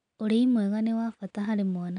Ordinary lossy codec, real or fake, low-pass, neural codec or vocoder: none; real; 9.9 kHz; none